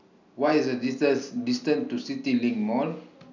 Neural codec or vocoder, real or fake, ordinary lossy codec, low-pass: none; real; none; 7.2 kHz